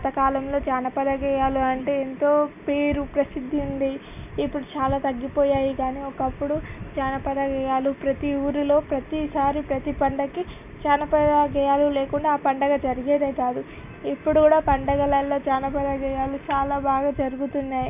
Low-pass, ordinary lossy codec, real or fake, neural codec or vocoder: 3.6 kHz; none; real; none